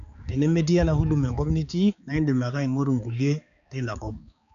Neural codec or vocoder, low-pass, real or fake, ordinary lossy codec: codec, 16 kHz, 4 kbps, X-Codec, HuBERT features, trained on balanced general audio; 7.2 kHz; fake; none